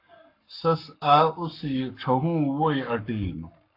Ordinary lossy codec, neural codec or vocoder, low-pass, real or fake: AAC, 32 kbps; codec, 44.1 kHz, 7.8 kbps, Pupu-Codec; 5.4 kHz; fake